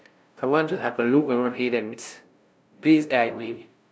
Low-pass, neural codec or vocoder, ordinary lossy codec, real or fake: none; codec, 16 kHz, 0.5 kbps, FunCodec, trained on LibriTTS, 25 frames a second; none; fake